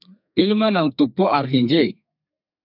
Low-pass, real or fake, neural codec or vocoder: 5.4 kHz; fake; codec, 32 kHz, 1.9 kbps, SNAC